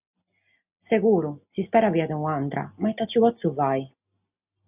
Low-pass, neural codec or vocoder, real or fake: 3.6 kHz; none; real